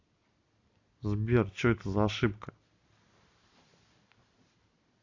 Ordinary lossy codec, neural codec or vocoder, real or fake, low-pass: AAC, 48 kbps; none; real; 7.2 kHz